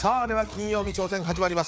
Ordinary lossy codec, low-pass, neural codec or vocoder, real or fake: none; none; codec, 16 kHz, 4 kbps, FreqCodec, larger model; fake